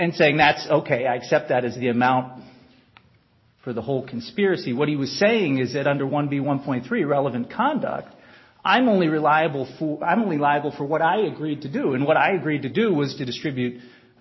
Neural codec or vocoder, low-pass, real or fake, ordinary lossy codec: none; 7.2 kHz; real; MP3, 24 kbps